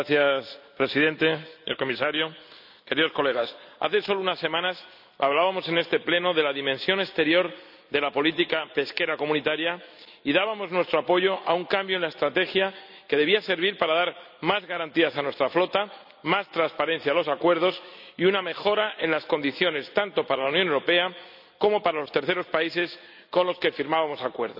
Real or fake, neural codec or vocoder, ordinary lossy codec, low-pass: real; none; none; 5.4 kHz